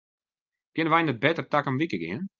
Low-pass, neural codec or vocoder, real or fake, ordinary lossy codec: 7.2 kHz; none; real; Opus, 24 kbps